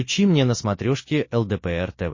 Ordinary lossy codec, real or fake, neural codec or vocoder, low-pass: MP3, 32 kbps; real; none; 7.2 kHz